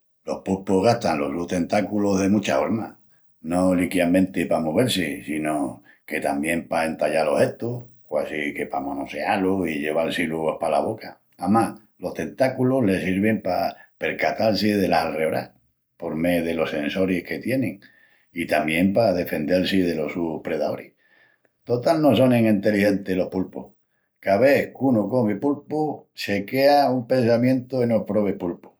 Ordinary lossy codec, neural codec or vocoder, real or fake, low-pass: none; none; real; none